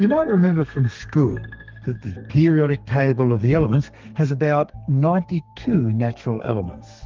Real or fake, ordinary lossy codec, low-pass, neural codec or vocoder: fake; Opus, 32 kbps; 7.2 kHz; codec, 32 kHz, 1.9 kbps, SNAC